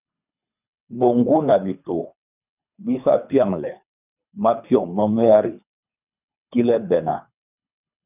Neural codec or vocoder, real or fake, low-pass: codec, 24 kHz, 3 kbps, HILCodec; fake; 3.6 kHz